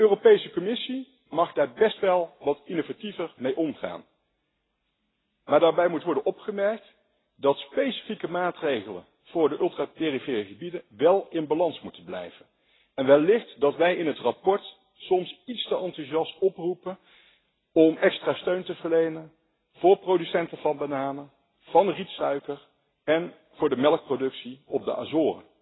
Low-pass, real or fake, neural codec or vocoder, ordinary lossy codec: 7.2 kHz; real; none; AAC, 16 kbps